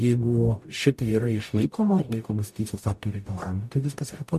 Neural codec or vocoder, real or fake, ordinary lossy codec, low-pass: codec, 44.1 kHz, 0.9 kbps, DAC; fake; MP3, 64 kbps; 14.4 kHz